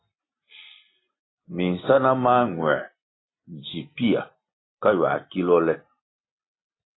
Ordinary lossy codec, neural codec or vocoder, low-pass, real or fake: AAC, 16 kbps; none; 7.2 kHz; real